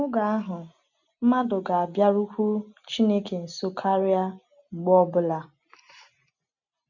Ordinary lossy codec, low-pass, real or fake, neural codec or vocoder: none; 7.2 kHz; real; none